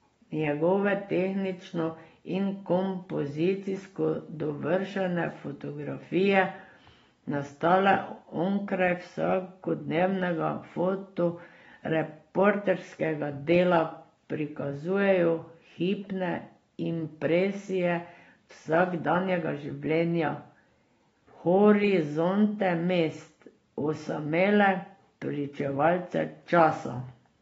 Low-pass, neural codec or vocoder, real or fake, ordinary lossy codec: 19.8 kHz; none; real; AAC, 24 kbps